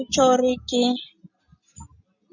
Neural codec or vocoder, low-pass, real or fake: none; 7.2 kHz; real